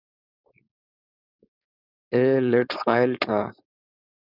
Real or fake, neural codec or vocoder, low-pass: fake; codec, 16 kHz, 4.8 kbps, FACodec; 5.4 kHz